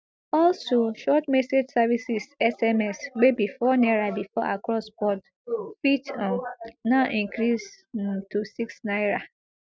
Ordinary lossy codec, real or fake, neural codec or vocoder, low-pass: none; real; none; none